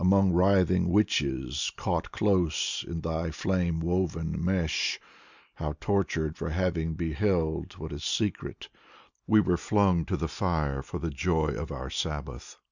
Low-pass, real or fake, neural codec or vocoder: 7.2 kHz; real; none